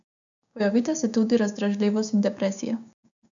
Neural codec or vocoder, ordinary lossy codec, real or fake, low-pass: codec, 16 kHz, 6 kbps, DAC; none; fake; 7.2 kHz